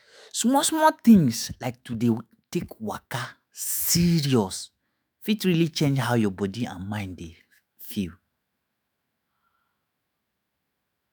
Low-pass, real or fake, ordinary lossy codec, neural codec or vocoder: none; fake; none; autoencoder, 48 kHz, 128 numbers a frame, DAC-VAE, trained on Japanese speech